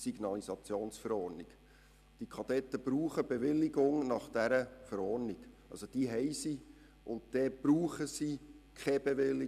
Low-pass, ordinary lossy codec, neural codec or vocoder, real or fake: 14.4 kHz; none; none; real